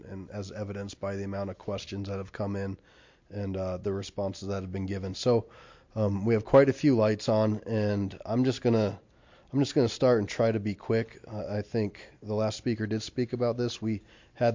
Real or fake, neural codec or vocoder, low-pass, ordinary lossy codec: real; none; 7.2 kHz; MP3, 48 kbps